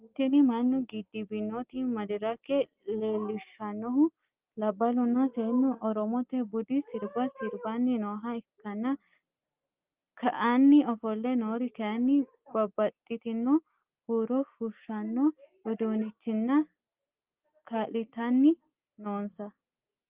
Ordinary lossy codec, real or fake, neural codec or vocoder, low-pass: Opus, 24 kbps; real; none; 3.6 kHz